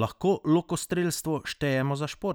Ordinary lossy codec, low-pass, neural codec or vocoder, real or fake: none; none; none; real